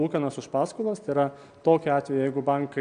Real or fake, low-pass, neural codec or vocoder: real; 9.9 kHz; none